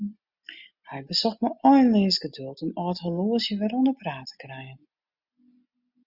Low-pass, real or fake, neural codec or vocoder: 5.4 kHz; real; none